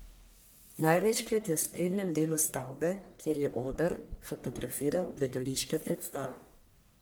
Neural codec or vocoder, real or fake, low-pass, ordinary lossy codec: codec, 44.1 kHz, 1.7 kbps, Pupu-Codec; fake; none; none